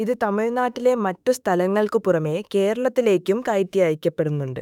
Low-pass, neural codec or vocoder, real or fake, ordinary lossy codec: 19.8 kHz; autoencoder, 48 kHz, 32 numbers a frame, DAC-VAE, trained on Japanese speech; fake; none